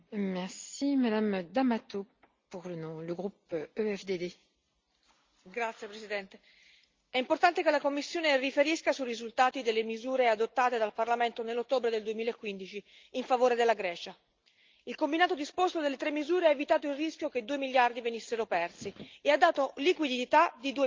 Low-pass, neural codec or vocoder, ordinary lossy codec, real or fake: 7.2 kHz; none; Opus, 32 kbps; real